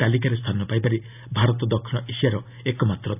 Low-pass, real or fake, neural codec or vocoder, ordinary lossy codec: 3.6 kHz; real; none; none